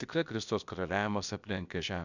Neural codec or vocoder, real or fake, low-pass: codec, 16 kHz, about 1 kbps, DyCAST, with the encoder's durations; fake; 7.2 kHz